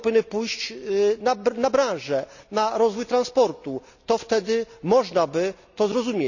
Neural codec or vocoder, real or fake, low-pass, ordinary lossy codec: none; real; 7.2 kHz; none